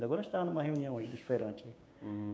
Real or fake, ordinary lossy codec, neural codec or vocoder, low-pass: fake; none; codec, 16 kHz, 6 kbps, DAC; none